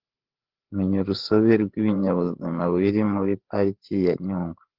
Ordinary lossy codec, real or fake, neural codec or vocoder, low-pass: Opus, 16 kbps; fake; codec, 16 kHz, 8 kbps, FreqCodec, larger model; 5.4 kHz